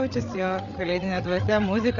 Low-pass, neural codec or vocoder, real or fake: 7.2 kHz; codec, 16 kHz, 8 kbps, FreqCodec, larger model; fake